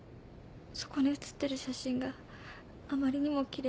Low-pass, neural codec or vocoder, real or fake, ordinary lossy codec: none; none; real; none